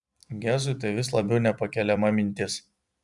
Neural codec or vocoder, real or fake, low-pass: vocoder, 44.1 kHz, 128 mel bands every 256 samples, BigVGAN v2; fake; 10.8 kHz